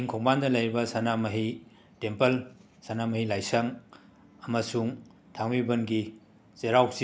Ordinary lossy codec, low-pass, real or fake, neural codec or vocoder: none; none; real; none